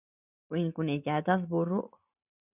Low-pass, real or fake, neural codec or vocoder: 3.6 kHz; real; none